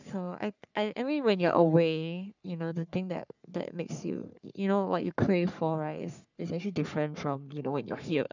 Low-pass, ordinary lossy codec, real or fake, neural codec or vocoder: 7.2 kHz; none; fake; codec, 44.1 kHz, 3.4 kbps, Pupu-Codec